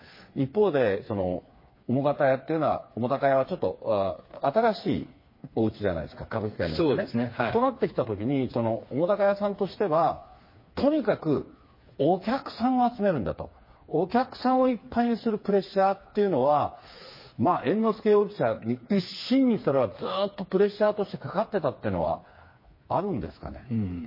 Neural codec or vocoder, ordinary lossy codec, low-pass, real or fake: codec, 16 kHz, 4 kbps, FreqCodec, smaller model; MP3, 24 kbps; 5.4 kHz; fake